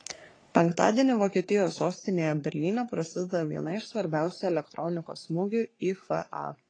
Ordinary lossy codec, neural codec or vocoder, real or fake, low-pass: AAC, 32 kbps; codec, 44.1 kHz, 3.4 kbps, Pupu-Codec; fake; 9.9 kHz